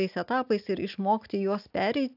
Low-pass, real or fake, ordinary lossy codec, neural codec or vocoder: 5.4 kHz; real; AAC, 48 kbps; none